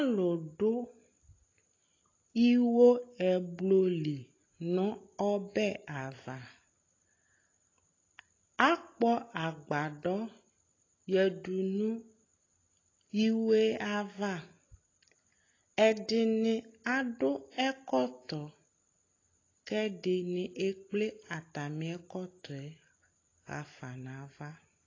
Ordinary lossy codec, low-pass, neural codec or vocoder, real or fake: AAC, 32 kbps; 7.2 kHz; none; real